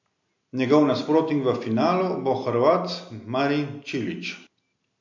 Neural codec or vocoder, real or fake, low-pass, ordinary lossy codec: none; real; 7.2 kHz; MP3, 48 kbps